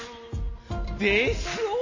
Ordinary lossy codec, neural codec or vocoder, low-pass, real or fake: MP3, 32 kbps; none; 7.2 kHz; real